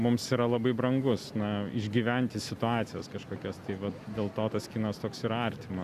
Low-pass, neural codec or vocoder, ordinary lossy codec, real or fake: 14.4 kHz; none; Opus, 64 kbps; real